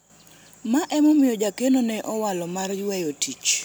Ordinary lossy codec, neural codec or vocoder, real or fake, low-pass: none; none; real; none